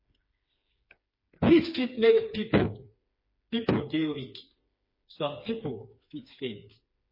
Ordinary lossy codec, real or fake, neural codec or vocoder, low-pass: MP3, 24 kbps; fake; codec, 16 kHz, 4 kbps, FreqCodec, smaller model; 5.4 kHz